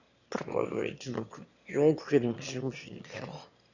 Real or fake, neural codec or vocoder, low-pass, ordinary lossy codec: fake; autoencoder, 22.05 kHz, a latent of 192 numbers a frame, VITS, trained on one speaker; 7.2 kHz; none